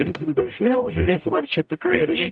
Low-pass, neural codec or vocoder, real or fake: 9.9 kHz; codec, 44.1 kHz, 0.9 kbps, DAC; fake